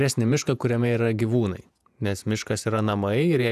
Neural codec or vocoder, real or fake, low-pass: vocoder, 48 kHz, 128 mel bands, Vocos; fake; 14.4 kHz